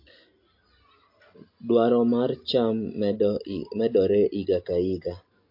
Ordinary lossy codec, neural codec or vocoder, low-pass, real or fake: MP3, 32 kbps; none; 5.4 kHz; real